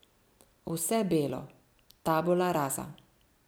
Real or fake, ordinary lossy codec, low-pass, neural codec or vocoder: real; none; none; none